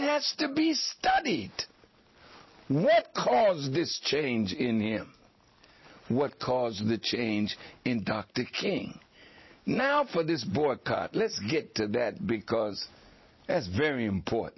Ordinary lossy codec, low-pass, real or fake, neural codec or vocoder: MP3, 24 kbps; 7.2 kHz; real; none